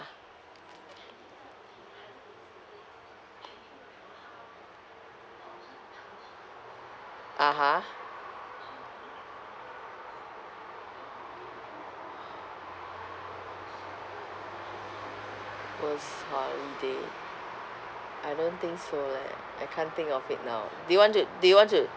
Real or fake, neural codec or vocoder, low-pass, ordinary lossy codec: real; none; none; none